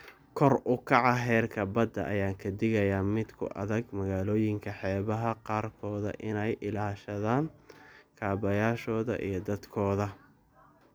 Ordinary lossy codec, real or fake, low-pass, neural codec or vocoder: none; real; none; none